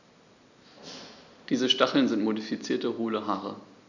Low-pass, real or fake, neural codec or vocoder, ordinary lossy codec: 7.2 kHz; real; none; none